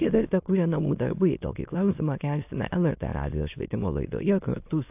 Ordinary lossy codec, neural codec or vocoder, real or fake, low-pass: AAC, 32 kbps; autoencoder, 22.05 kHz, a latent of 192 numbers a frame, VITS, trained on many speakers; fake; 3.6 kHz